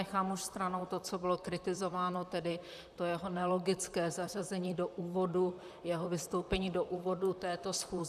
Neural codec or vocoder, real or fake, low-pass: vocoder, 44.1 kHz, 128 mel bands, Pupu-Vocoder; fake; 14.4 kHz